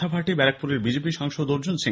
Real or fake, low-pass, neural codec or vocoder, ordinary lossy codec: real; none; none; none